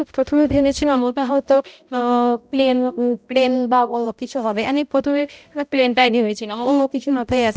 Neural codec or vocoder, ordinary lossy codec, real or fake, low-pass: codec, 16 kHz, 0.5 kbps, X-Codec, HuBERT features, trained on balanced general audio; none; fake; none